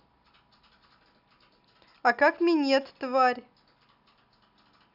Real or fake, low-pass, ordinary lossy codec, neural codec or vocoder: real; 5.4 kHz; none; none